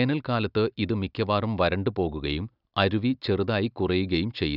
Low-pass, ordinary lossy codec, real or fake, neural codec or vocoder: 5.4 kHz; none; real; none